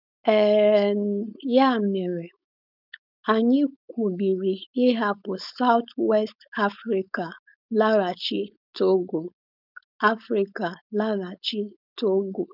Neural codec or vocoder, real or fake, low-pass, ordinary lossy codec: codec, 16 kHz, 4.8 kbps, FACodec; fake; 5.4 kHz; none